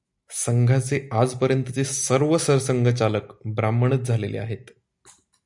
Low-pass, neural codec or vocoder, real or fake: 10.8 kHz; none; real